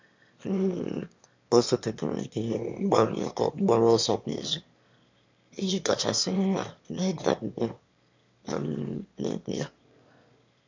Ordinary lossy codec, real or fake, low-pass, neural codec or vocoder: MP3, 64 kbps; fake; 7.2 kHz; autoencoder, 22.05 kHz, a latent of 192 numbers a frame, VITS, trained on one speaker